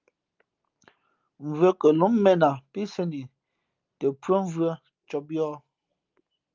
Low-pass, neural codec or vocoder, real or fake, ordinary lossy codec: 7.2 kHz; none; real; Opus, 24 kbps